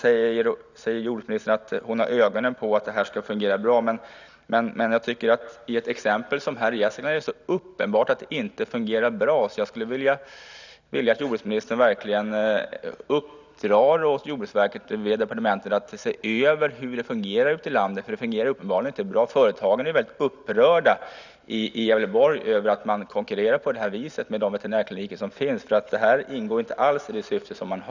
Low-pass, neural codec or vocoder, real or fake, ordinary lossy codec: 7.2 kHz; none; real; none